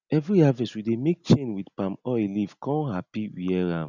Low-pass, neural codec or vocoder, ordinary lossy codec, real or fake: 7.2 kHz; none; none; real